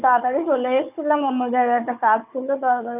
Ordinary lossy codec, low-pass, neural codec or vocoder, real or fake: none; 3.6 kHz; codec, 16 kHz, 4 kbps, FunCodec, trained on Chinese and English, 50 frames a second; fake